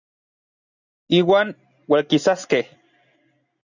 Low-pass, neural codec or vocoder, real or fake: 7.2 kHz; none; real